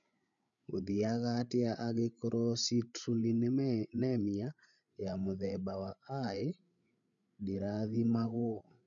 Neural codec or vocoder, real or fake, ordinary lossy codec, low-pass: codec, 16 kHz, 8 kbps, FreqCodec, larger model; fake; none; 7.2 kHz